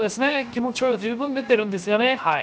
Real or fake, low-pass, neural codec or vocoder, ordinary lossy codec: fake; none; codec, 16 kHz, 0.7 kbps, FocalCodec; none